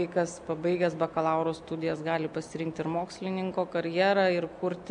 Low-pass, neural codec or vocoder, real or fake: 9.9 kHz; none; real